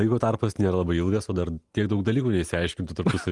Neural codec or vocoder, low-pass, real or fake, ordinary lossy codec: none; 10.8 kHz; real; Opus, 24 kbps